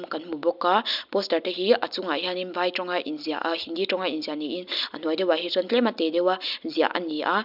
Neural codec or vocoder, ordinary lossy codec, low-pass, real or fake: none; none; 5.4 kHz; real